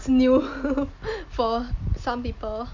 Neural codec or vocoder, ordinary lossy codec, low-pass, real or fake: none; MP3, 64 kbps; 7.2 kHz; real